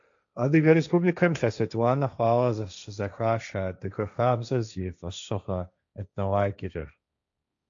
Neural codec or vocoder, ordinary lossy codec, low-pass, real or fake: codec, 16 kHz, 1.1 kbps, Voila-Tokenizer; AAC, 64 kbps; 7.2 kHz; fake